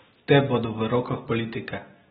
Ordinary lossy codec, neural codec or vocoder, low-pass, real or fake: AAC, 16 kbps; none; 10.8 kHz; real